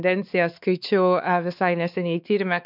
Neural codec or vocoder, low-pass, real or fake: codec, 16 kHz, 4 kbps, X-Codec, WavLM features, trained on Multilingual LibriSpeech; 5.4 kHz; fake